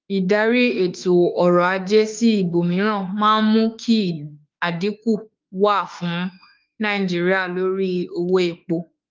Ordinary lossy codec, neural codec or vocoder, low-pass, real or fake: Opus, 24 kbps; autoencoder, 48 kHz, 32 numbers a frame, DAC-VAE, trained on Japanese speech; 7.2 kHz; fake